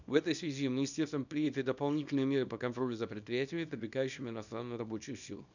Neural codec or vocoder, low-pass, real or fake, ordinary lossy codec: codec, 24 kHz, 0.9 kbps, WavTokenizer, small release; 7.2 kHz; fake; none